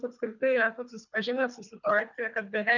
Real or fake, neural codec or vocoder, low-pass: fake; codec, 24 kHz, 3 kbps, HILCodec; 7.2 kHz